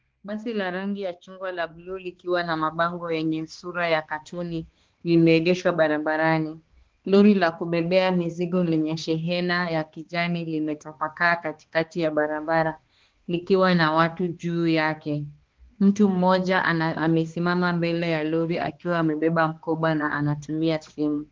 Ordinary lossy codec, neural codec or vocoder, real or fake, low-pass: Opus, 16 kbps; codec, 16 kHz, 2 kbps, X-Codec, HuBERT features, trained on balanced general audio; fake; 7.2 kHz